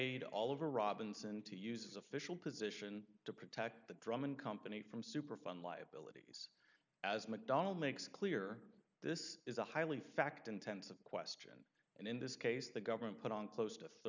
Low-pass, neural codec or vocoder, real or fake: 7.2 kHz; none; real